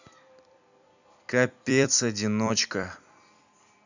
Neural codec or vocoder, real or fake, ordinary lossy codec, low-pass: vocoder, 44.1 kHz, 128 mel bands every 256 samples, BigVGAN v2; fake; none; 7.2 kHz